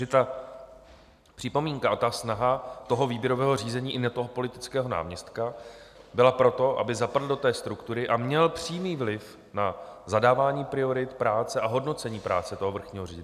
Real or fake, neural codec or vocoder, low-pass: real; none; 14.4 kHz